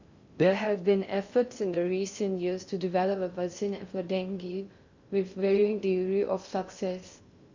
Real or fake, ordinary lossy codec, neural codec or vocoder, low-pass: fake; Opus, 64 kbps; codec, 16 kHz in and 24 kHz out, 0.6 kbps, FocalCodec, streaming, 2048 codes; 7.2 kHz